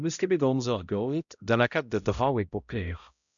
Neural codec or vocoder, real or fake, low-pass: codec, 16 kHz, 0.5 kbps, X-Codec, HuBERT features, trained on balanced general audio; fake; 7.2 kHz